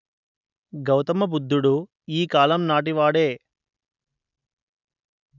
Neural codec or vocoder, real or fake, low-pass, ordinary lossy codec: none; real; 7.2 kHz; none